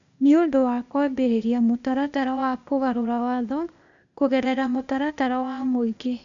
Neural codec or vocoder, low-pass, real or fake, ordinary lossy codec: codec, 16 kHz, 0.8 kbps, ZipCodec; 7.2 kHz; fake; none